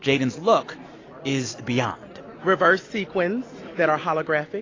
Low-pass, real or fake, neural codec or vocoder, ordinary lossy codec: 7.2 kHz; real; none; AAC, 32 kbps